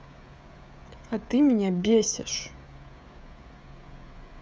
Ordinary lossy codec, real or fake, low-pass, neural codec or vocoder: none; fake; none; codec, 16 kHz, 16 kbps, FreqCodec, smaller model